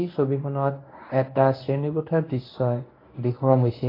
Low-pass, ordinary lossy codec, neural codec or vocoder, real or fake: 5.4 kHz; AAC, 24 kbps; codec, 24 kHz, 0.9 kbps, WavTokenizer, medium speech release version 1; fake